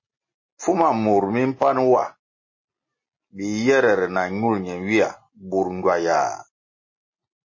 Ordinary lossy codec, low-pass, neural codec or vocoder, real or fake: MP3, 32 kbps; 7.2 kHz; none; real